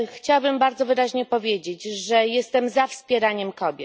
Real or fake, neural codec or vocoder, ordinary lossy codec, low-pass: real; none; none; none